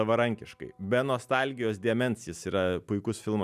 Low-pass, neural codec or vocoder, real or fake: 14.4 kHz; none; real